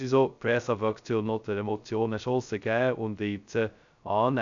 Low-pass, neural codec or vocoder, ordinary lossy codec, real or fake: 7.2 kHz; codec, 16 kHz, 0.2 kbps, FocalCodec; none; fake